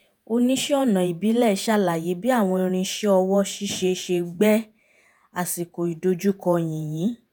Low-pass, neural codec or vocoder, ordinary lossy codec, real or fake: none; vocoder, 48 kHz, 128 mel bands, Vocos; none; fake